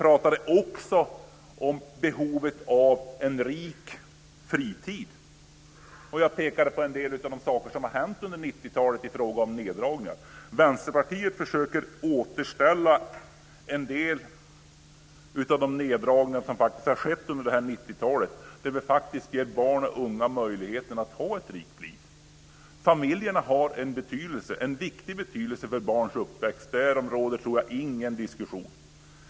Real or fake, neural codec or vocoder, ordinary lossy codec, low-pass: real; none; none; none